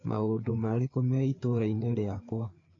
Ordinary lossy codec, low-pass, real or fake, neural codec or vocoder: AAC, 32 kbps; 7.2 kHz; fake; codec, 16 kHz, 4 kbps, FreqCodec, larger model